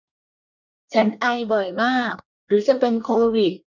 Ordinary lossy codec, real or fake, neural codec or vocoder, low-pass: none; fake; codec, 24 kHz, 1 kbps, SNAC; 7.2 kHz